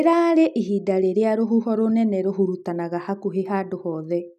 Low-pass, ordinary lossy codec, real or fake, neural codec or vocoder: 14.4 kHz; none; real; none